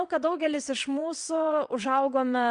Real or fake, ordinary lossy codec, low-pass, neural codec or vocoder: fake; Opus, 64 kbps; 9.9 kHz; vocoder, 22.05 kHz, 80 mel bands, WaveNeXt